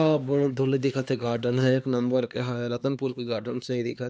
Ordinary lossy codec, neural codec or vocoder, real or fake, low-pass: none; codec, 16 kHz, 2 kbps, X-Codec, HuBERT features, trained on LibriSpeech; fake; none